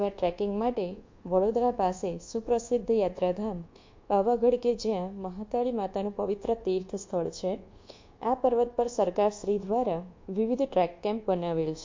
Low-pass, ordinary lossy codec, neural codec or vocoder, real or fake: 7.2 kHz; MP3, 48 kbps; codec, 24 kHz, 1.2 kbps, DualCodec; fake